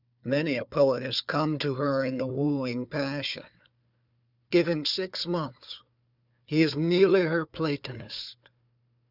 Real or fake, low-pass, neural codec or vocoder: fake; 5.4 kHz; codec, 16 kHz, 4 kbps, FunCodec, trained on Chinese and English, 50 frames a second